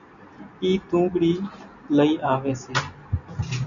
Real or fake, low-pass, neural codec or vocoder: real; 7.2 kHz; none